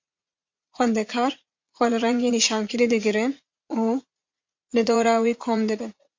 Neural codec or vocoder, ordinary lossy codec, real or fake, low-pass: vocoder, 44.1 kHz, 80 mel bands, Vocos; MP3, 48 kbps; fake; 7.2 kHz